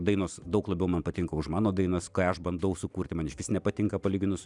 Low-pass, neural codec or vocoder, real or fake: 10.8 kHz; none; real